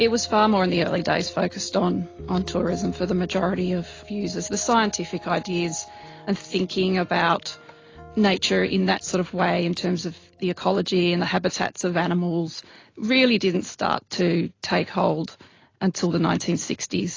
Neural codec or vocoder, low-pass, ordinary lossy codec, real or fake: none; 7.2 kHz; AAC, 32 kbps; real